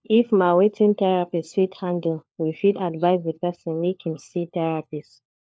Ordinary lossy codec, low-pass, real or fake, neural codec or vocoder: none; none; fake; codec, 16 kHz, 4 kbps, FunCodec, trained on LibriTTS, 50 frames a second